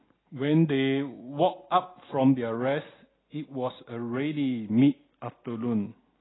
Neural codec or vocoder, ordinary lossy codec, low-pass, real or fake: none; AAC, 16 kbps; 7.2 kHz; real